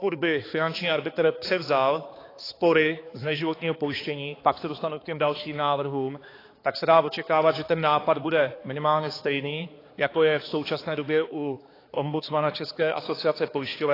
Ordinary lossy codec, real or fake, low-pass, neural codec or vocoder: AAC, 24 kbps; fake; 5.4 kHz; codec, 16 kHz, 4 kbps, X-Codec, HuBERT features, trained on balanced general audio